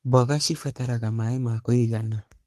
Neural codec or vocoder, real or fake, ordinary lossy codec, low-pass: codec, 44.1 kHz, 3.4 kbps, Pupu-Codec; fake; Opus, 24 kbps; 14.4 kHz